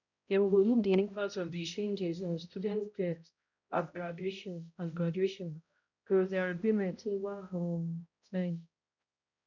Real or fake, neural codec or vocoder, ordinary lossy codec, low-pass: fake; codec, 16 kHz, 0.5 kbps, X-Codec, HuBERT features, trained on balanced general audio; none; 7.2 kHz